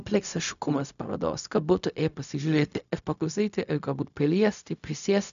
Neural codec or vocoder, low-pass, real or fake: codec, 16 kHz, 0.4 kbps, LongCat-Audio-Codec; 7.2 kHz; fake